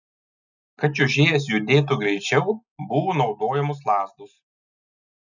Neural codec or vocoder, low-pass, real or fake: none; 7.2 kHz; real